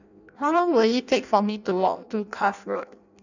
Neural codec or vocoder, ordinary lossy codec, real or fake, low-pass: codec, 16 kHz in and 24 kHz out, 0.6 kbps, FireRedTTS-2 codec; none; fake; 7.2 kHz